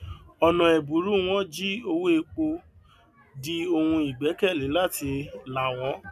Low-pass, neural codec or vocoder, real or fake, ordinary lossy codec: 14.4 kHz; none; real; none